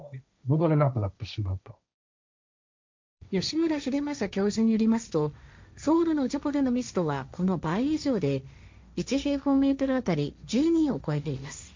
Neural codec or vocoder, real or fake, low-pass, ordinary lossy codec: codec, 16 kHz, 1.1 kbps, Voila-Tokenizer; fake; 7.2 kHz; MP3, 64 kbps